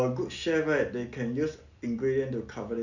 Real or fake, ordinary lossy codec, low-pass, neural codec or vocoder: real; none; 7.2 kHz; none